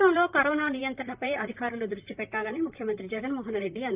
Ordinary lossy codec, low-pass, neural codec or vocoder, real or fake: Opus, 24 kbps; 3.6 kHz; vocoder, 44.1 kHz, 128 mel bands, Pupu-Vocoder; fake